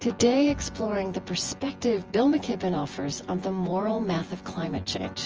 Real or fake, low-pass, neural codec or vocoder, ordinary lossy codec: fake; 7.2 kHz; vocoder, 24 kHz, 100 mel bands, Vocos; Opus, 24 kbps